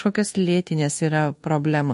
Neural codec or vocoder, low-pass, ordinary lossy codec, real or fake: codec, 24 kHz, 1.2 kbps, DualCodec; 10.8 kHz; MP3, 48 kbps; fake